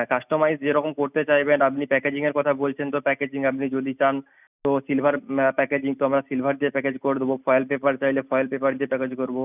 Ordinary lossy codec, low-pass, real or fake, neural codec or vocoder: none; 3.6 kHz; real; none